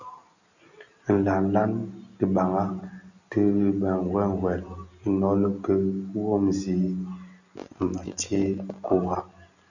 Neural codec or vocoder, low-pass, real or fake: none; 7.2 kHz; real